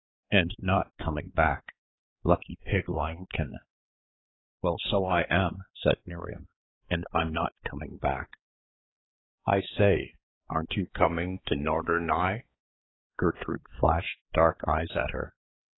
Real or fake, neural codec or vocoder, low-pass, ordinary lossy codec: fake; codec, 16 kHz, 4 kbps, X-Codec, HuBERT features, trained on balanced general audio; 7.2 kHz; AAC, 16 kbps